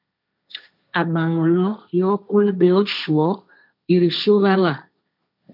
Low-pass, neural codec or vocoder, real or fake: 5.4 kHz; codec, 16 kHz, 1.1 kbps, Voila-Tokenizer; fake